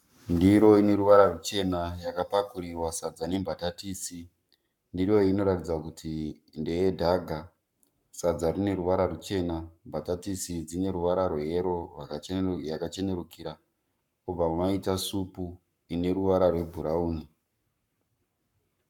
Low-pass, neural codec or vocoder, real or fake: 19.8 kHz; codec, 44.1 kHz, 7.8 kbps, Pupu-Codec; fake